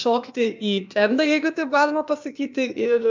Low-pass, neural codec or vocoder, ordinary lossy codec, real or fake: 7.2 kHz; codec, 16 kHz, 0.8 kbps, ZipCodec; MP3, 64 kbps; fake